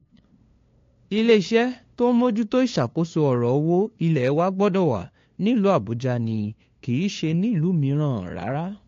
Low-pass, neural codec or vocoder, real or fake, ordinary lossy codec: 7.2 kHz; codec, 16 kHz, 2 kbps, FunCodec, trained on LibriTTS, 25 frames a second; fake; AAC, 48 kbps